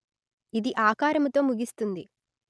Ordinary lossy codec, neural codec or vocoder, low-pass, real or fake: none; none; none; real